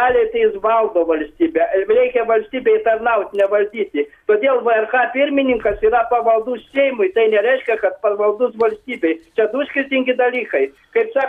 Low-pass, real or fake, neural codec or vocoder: 14.4 kHz; real; none